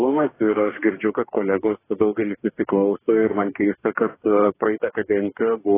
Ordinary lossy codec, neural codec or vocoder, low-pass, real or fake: AAC, 16 kbps; codec, 44.1 kHz, 2.6 kbps, DAC; 3.6 kHz; fake